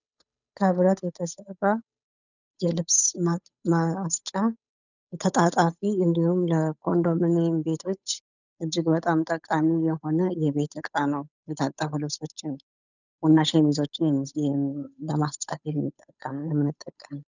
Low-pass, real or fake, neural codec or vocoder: 7.2 kHz; fake; codec, 16 kHz, 8 kbps, FunCodec, trained on Chinese and English, 25 frames a second